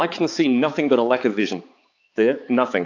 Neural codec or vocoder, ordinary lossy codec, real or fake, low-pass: codec, 16 kHz, 4 kbps, X-Codec, HuBERT features, trained on balanced general audio; AAC, 48 kbps; fake; 7.2 kHz